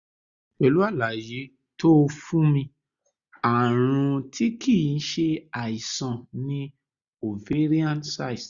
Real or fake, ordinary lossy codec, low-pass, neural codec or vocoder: real; Opus, 64 kbps; 7.2 kHz; none